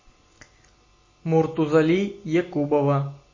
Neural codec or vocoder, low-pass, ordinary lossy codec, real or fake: none; 7.2 kHz; MP3, 32 kbps; real